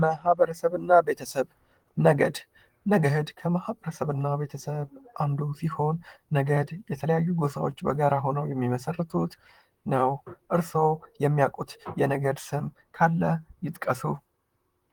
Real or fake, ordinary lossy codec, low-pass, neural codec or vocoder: fake; Opus, 24 kbps; 19.8 kHz; vocoder, 44.1 kHz, 128 mel bands, Pupu-Vocoder